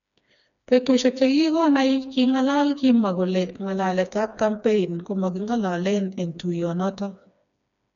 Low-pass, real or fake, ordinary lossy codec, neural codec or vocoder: 7.2 kHz; fake; none; codec, 16 kHz, 2 kbps, FreqCodec, smaller model